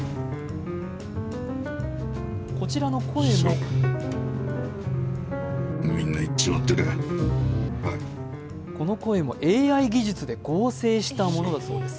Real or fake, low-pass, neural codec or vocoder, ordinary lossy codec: real; none; none; none